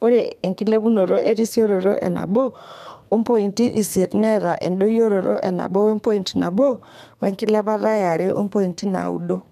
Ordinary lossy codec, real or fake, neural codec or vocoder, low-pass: none; fake; codec, 32 kHz, 1.9 kbps, SNAC; 14.4 kHz